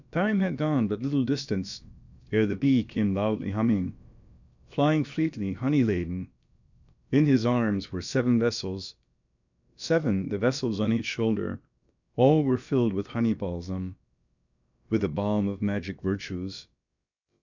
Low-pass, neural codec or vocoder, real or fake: 7.2 kHz; codec, 16 kHz, about 1 kbps, DyCAST, with the encoder's durations; fake